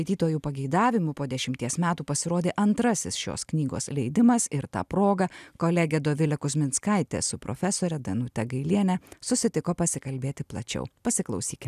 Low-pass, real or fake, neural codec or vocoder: 14.4 kHz; real; none